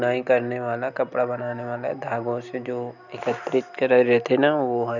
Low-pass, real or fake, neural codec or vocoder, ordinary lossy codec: 7.2 kHz; real; none; none